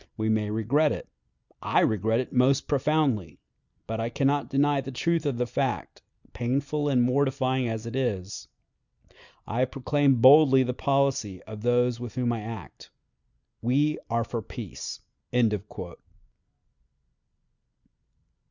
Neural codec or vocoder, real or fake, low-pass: none; real; 7.2 kHz